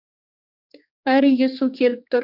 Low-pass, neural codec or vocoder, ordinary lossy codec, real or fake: 5.4 kHz; codec, 16 kHz, 4 kbps, X-Codec, HuBERT features, trained on general audio; none; fake